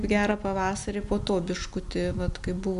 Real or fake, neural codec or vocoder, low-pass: real; none; 10.8 kHz